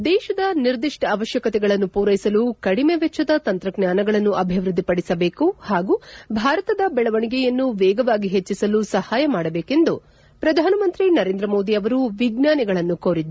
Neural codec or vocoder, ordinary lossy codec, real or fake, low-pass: none; none; real; none